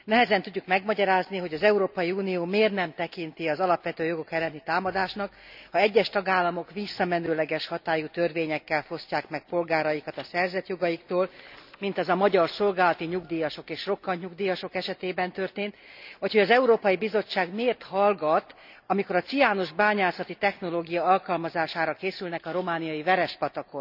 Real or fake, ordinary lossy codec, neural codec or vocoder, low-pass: real; none; none; 5.4 kHz